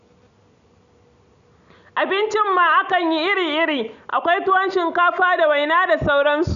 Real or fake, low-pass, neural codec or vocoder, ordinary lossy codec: real; 7.2 kHz; none; none